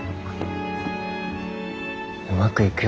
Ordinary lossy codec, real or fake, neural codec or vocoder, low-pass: none; real; none; none